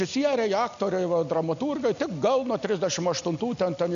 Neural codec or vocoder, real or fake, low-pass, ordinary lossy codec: none; real; 7.2 kHz; AAC, 96 kbps